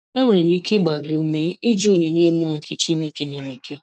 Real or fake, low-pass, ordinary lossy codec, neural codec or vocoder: fake; 9.9 kHz; none; codec, 24 kHz, 1 kbps, SNAC